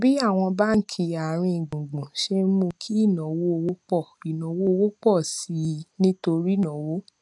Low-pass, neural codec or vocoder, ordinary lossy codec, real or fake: 10.8 kHz; none; none; real